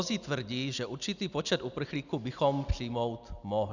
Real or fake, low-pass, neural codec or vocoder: real; 7.2 kHz; none